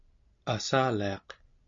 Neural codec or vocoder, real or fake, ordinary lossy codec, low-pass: none; real; MP3, 48 kbps; 7.2 kHz